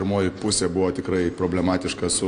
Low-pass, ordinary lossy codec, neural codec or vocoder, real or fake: 9.9 kHz; AAC, 48 kbps; none; real